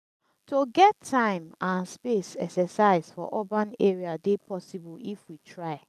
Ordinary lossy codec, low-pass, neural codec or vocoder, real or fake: none; 14.4 kHz; none; real